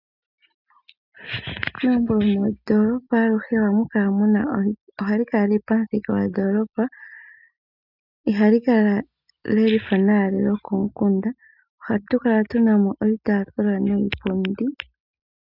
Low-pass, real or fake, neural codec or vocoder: 5.4 kHz; real; none